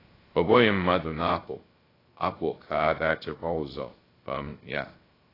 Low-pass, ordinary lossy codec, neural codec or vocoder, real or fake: 5.4 kHz; AAC, 24 kbps; codec, 16 kHz, 0.3 kbps, FocalCodec; fake